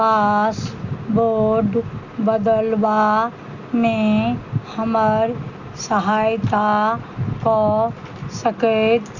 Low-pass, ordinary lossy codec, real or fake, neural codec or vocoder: 7.2 kHz; none; real; none